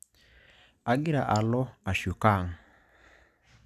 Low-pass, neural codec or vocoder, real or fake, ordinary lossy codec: 14.4 kHz; none; real; none